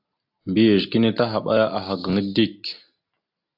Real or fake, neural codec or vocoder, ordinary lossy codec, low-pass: real; none; AAC, 48 kbps; 5.4 kHz